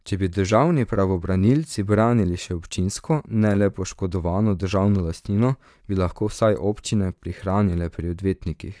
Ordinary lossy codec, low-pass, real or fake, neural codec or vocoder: none; none; fake; vocoder, 22.05 kHz, 80 mel bands, Vocos